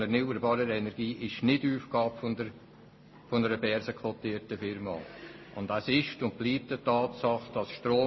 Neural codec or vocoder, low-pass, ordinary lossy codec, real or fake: none; 7.2 kHz; MP3, 24 kbps; real